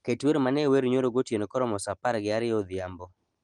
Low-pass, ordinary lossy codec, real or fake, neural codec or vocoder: 9.9 kHz; Opus, 24 kbps; real; none